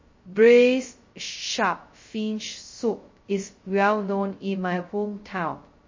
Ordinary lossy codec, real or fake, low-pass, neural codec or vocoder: MP3, 32 kbps; fake; 7.2 kHz; codec, 16 kHz, 0.2 kbps, FocalCodec